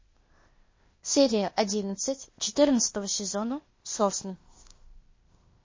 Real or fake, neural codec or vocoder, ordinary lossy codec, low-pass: fake; codec, 16 kHz, 0.8 kbps, ZipCodec; MP3, 32 kbps; 7.2 kHz